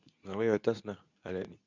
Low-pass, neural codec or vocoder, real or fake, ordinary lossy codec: 7.2 kHz; codec, 24 kHz, 0.9 kbps, WavTokenizer, medium speech release version 2; fake; none